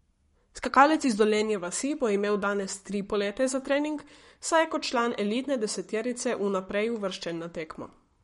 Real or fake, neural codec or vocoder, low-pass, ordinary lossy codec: fake; codec, 44.1 kHz, 7.8 kbps, Pupu-Codec; 19.8 kHz; MP3, 48 kbps